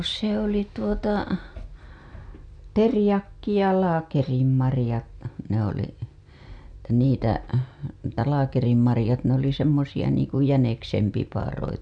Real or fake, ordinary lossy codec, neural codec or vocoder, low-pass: real; none; none; 9.9 kHz